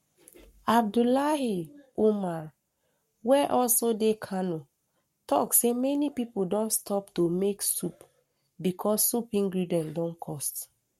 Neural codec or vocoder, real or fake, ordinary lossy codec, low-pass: codec, 44.1 kHz, 7.8 kbps, Pupu-Codec; fake; MP3, 64 kbps; 19.8 kHz